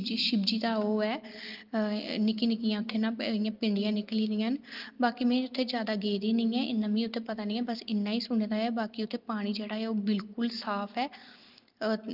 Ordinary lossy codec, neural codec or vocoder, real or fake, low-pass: Opus, 32 kbps; none; real; 5.4 kHz